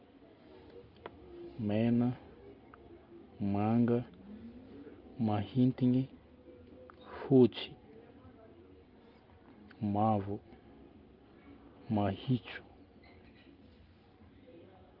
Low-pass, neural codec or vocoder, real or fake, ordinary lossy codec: 5.4 kHz; none; real; Opus, 32 kbps